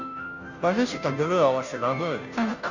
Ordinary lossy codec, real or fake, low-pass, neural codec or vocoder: none; fake; 7.2 kHz; codec, 16 kHz, 0.5 kbps, FunCodec, trained on Chinese and English, 25 frames a second